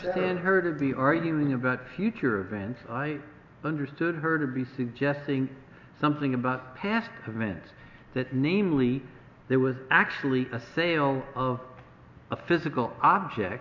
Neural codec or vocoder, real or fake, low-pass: none; real; 7.2 kHz